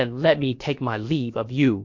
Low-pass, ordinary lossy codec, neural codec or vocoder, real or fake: 7.2 kHz; MP3, 48 kbps; codec, 16 kHz, about 1 kbps, DyCAST, with the encoder's durations; fake